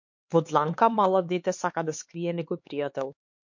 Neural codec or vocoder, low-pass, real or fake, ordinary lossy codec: codec, 16 kHz, 4 kbps, X-Codec, HuBERT features, trained on LibriSpeech; 7.2 kHz; fake; MP3, 48 kbps